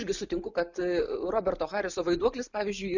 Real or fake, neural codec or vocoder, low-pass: real; none; 7.2 kHz